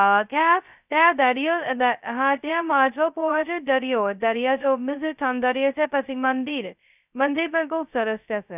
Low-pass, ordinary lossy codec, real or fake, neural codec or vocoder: 3.6 kHz; none; fake; codec, 16 kHz, 0.2 kbps, FocalCodec